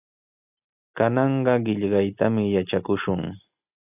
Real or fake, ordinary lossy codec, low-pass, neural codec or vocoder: real; AAC, 32 kbps; 3.6 kHz; none